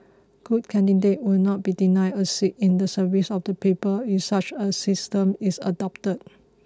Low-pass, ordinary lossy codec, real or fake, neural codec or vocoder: none; none; real; none